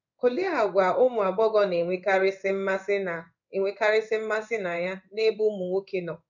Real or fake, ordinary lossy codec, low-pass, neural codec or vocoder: fake; none; 7.2 kHz; codec, 16 kHz in and 24 kHz out, 1 kbps, XY-Tokenizer